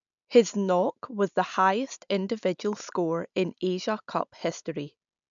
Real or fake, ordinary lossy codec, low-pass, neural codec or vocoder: real; MP3, 96 kbps; 7.2 kHz; none